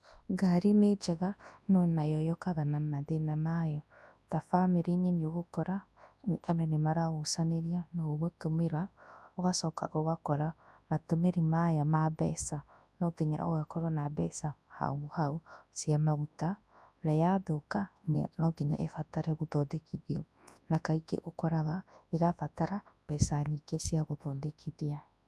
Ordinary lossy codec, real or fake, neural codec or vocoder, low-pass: none; fake; codec, 24 kHz, 0.9 kbps, WavTokenizer, large speech release; none